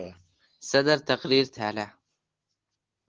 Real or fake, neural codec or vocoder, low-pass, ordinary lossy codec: real; none; 7.2 kHz; Opus, 16 kbps